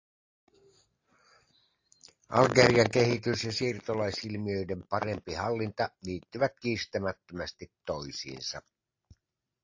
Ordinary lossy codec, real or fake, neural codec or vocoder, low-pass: AAC, 48 kbps; real; none; 7.2 kHz